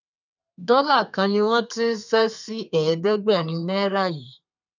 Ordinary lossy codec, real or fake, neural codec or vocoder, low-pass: none; fake; codec, 32 kHz, 1.9 kbps, SNAC; 7.2 kHz